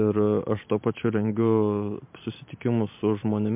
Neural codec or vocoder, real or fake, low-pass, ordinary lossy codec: vocoder, 44.1 kHz, 128 mel bands every 512 samples, BigVGAN v2; fake; 3.6 kHz; MP3, 32 kbps